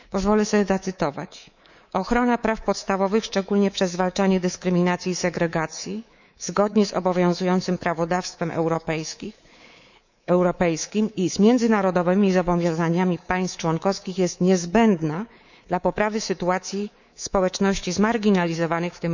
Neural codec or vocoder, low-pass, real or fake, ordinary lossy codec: codec, 24 kHz, 3.1 kbps, DualCodec; 7.2 kHz; fake; none